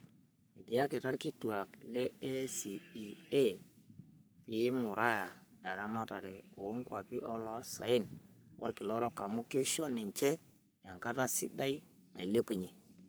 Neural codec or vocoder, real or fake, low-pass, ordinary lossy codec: codec, 44.1 kHz, 3.4 kbps, Pupu-Codec; fake; none; none